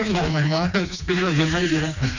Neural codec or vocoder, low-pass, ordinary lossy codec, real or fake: codec, 16 kHz, 2 kbps, FreqCodec, smaller model; 7.2 kHz; none; fake